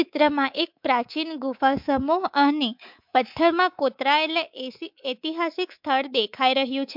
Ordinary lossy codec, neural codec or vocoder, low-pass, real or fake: MP3, 48 kbps; codec, 24 kHz, 3.1 kbps, DualCodec; 5.4 kHz; fake